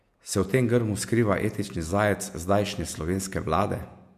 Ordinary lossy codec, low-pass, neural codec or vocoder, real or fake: MP3, 96 kbps; 14.4 kHz; vocoder, 48 kHz, 128 mel bands, Vocos; fake